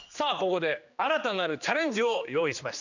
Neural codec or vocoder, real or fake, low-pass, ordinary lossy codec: codec, 16 kHz, 2 kbps, X-Codec, HuBERT features, trained on general audio; fake; 7.2 kHz; none